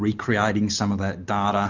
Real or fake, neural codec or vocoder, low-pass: real; none; 7.2 kHz